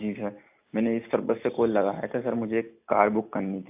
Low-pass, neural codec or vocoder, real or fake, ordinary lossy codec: 3.6 kHz; none; real; AAC, 32 kbps